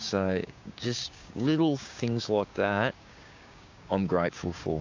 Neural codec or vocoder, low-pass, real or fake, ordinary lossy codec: codec, 16 kHz, 6 kbps, DAC; 7.2 kHz; fake; AAC, 48 kbps